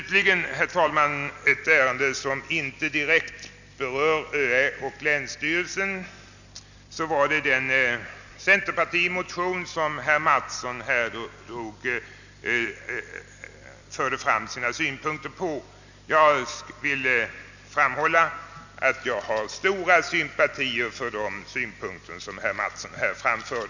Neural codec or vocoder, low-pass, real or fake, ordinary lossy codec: none; 7.2 kHz; real; none